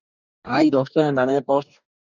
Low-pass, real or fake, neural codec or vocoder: 7.2 kHz; fake; codec, 44.1 kHz, 2.6 kbps, DAC